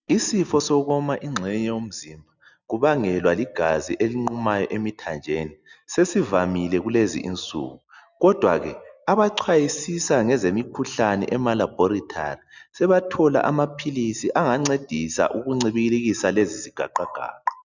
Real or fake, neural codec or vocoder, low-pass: real; none; 7.2 kHz